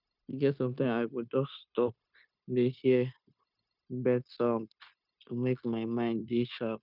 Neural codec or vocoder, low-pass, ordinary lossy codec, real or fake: codec, 16 kHz, 0.9 kbps, LongCat-Audio-Codec; 5.4 kHz; none; fake